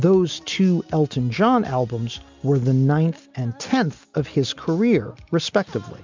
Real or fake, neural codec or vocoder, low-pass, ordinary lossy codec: real; none; 7.2 kHz; MP3, 64 kbps